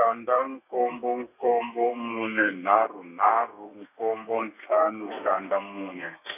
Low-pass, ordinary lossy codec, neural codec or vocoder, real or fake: 3.6 kHz; none; codec, 32 kHz, 1.9 kbps, SNAC; fake